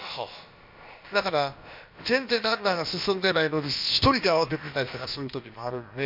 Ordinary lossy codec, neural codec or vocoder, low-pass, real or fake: MP3, 48 kbps; codec, 16 kHz, about 1 kbps, DyCAST, with the encoder's durations; 5.4 kHz; fake